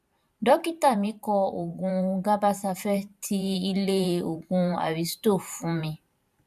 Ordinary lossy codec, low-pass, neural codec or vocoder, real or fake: none; 14.4 kHz; vocoder, 44.1 kHz, 128 mel bands every 512 samples, BigVGAN v2; fake